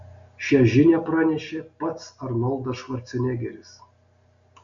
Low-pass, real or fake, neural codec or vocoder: 7.2 kHz; real; none